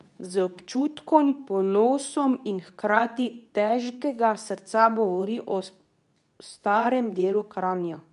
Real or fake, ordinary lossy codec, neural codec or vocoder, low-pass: fake; none; codec, 24 kHz, 0.9 kbps, WavTokenizer, medium speech release version 2; 10.8 kHz